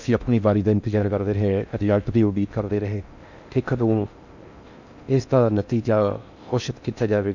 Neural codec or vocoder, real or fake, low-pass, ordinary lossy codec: codec, 16 kHz in and 24 kHz out, 0.6 kbps, FocalCodec, streaming, 4096 codes; fake; 7.2 kHz; none